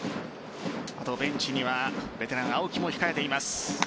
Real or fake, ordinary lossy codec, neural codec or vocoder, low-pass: real; none; none; none